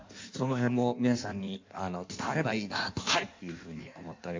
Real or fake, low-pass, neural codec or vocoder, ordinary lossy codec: fake; 7.2 kHz; codec, 16 kHz in and 24 kHz out, 1.1 kbps, FireRedTTS-2 codec; MP3, 48 kbps